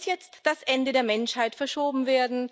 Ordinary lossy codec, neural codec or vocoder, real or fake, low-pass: none; none; real; none